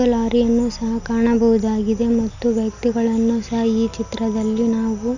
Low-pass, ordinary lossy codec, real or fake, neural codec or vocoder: 7.2 kHz; none; real; none